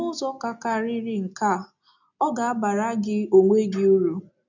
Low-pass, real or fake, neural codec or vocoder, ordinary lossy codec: 7.2 kHz; real; none; none